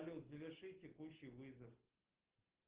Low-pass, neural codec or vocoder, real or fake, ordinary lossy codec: 3.6 kHz; none; real; Opus, 16 kbps